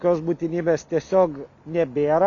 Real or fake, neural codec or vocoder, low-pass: real; none; 7.2 kHz